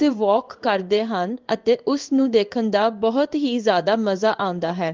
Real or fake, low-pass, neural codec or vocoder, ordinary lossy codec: fake; 7.2 kHz; codec, 16 kHz in and 24 kHz out, 1 kbps, XY-Tokenizer; Opus, 32 kbps